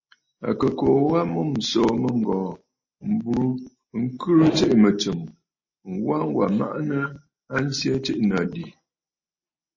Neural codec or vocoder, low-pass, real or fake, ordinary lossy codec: none; 7.2 kHz; real; MP3, 32 kbps